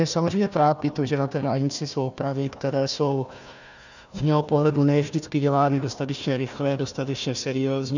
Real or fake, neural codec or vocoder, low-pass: fake; codec, 16 kHz, 1 kbps, FunCodec, trained on Chinese and English, 50 frames a second; 7.2 kHz